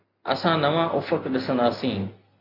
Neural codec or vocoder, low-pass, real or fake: none; 5.4 kHz; real